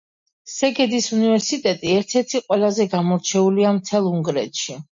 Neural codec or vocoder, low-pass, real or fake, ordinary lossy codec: none; 7.2 kHz; real; MP3, 48 kbps